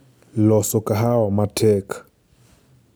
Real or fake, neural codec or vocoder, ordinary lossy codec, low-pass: real; none; none; none